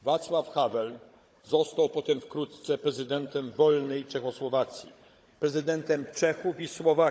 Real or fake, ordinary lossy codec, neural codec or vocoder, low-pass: fake; none; codec, 16 kHz, 16 kbps, FunCodec, trained on Chinese and English, 50 frames a second; none